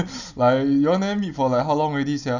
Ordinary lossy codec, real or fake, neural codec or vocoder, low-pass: none; real; none; 7.2 kHz